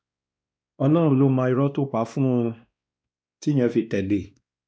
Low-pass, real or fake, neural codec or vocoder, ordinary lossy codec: none; fake; codec, 16 kHz, 2 kbps, X-Codec, WavLM features, trained on Multilingual LibriSpeech; none